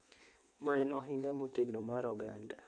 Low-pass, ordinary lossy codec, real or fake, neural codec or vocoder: 9.9 kHz; none; fake; codec, 16 kHz in and 24 kHz out, 1.1 kbps, FireRedTTS-2 codec